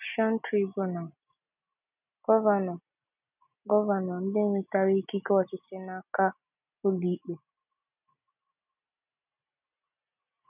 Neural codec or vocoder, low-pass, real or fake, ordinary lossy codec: none; 3.6 kHz; real; none